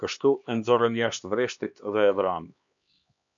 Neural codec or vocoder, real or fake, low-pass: codec, 16 kHz, 2 kbps, X-Codec, HuBERT features, trained on LibriSpeech; fake; 7.2 kHz